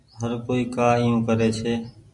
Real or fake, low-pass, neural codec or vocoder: real; 10.8 kHz; none